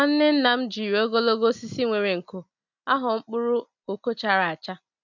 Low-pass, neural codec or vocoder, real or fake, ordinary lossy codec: 7.2 kHz; none; real; none